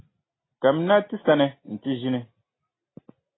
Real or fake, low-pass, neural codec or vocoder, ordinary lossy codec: real; 7.2 kHz; none; AAC, 16 kbps